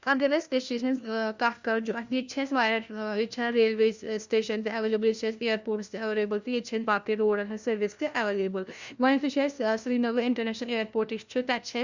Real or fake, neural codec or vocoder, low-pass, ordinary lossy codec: fake; codec, 16 kHz, 1 kbps, FunCodec, trained on LibriTTS, 50 frames a second; 7.2 kHz; Opus, 64 kbps